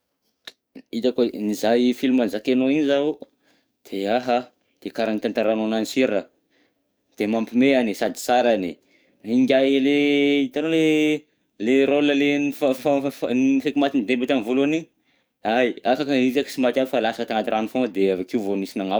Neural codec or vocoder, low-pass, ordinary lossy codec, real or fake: codec, 44.1 kHz, 7.8 kbps, DAC; none; none; fake